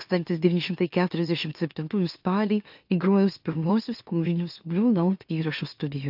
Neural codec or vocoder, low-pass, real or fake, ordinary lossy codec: autoencoder, 44.1 kHz, a latent of 192 numbers a frame, MeloTTS; 5.4 kHz; fake; AAC, 48 kbps